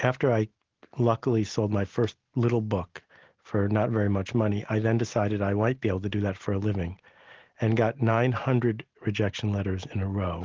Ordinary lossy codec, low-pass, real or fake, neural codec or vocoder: Opus, 16 kbps; 7.2 kHz; real; none